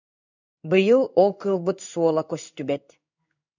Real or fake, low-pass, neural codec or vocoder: fake; 7.2 kHz; codec, 16 kHz in and 24 kHz out, 1 kbps, XY-Tokenizer